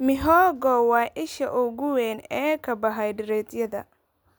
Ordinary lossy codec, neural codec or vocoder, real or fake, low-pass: none; none; real; none